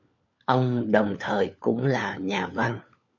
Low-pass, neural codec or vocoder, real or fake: 7.2 kHz; codec, 16 kHz, 4 kbps, FunCodec, trained on LibriTTS, 50 frames a second; fake